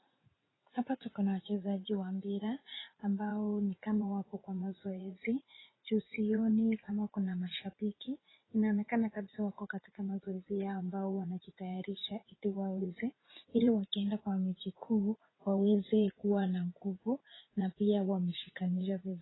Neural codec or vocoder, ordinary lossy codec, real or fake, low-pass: vocoder, 44.1 kHz, 80 mel bands, Vocos; AAC, 16 kbps; fake; 7.2 kHz